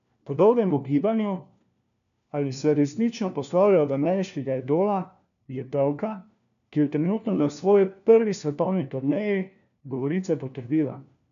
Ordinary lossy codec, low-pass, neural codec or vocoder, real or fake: none; 7.2 kHz; codec, 16 kHz, 1 kbps, FunCodec, trained on LibriTTS, 50 frames a second; fake